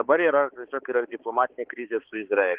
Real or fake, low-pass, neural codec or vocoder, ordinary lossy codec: fake; 3.6 kHz; codec, 16 kHz, 4 kbps, X-Codec, HuBERT features, trained on balanced general audio; Opus, 16 kbps